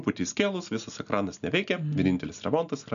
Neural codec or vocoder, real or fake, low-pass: none; real; 7.2 kHz